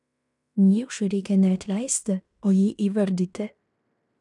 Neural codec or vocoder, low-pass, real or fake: codec, 16 kHz in and 24 kHz out, 0.9 kbps, LongCat-Audio-Codec, fine tuned four codebook decoder; 10.8 kHz; fake